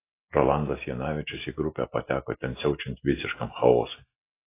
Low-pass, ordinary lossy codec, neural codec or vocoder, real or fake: 3.6 kHz; AAC, 24 kbps; none; real